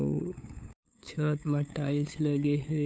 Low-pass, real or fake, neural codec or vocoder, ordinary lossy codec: none; fake; codec, 16 kHz, 8 kbps, FunCodec, trained on LibriTTS, 25 frames a second; none